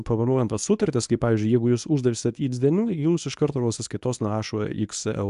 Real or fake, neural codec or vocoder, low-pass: fake; codec, 24 kHz, 0.9 kbps, WavTokenizer, medium speech release version 1; 10.8 kHz